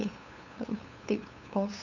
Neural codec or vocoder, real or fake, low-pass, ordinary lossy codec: codec, 16 kHz, 2 kbps, FunCodec, trained on LibriTTS, 25 frames a second; fake; 7.2 kHz; none